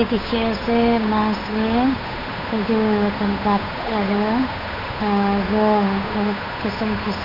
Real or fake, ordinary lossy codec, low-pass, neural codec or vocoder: fake; none; 5.4 kHz; codec, 16 kHz, 8 kbps, FunCodec, trained on Chinese and English, 25 frames a second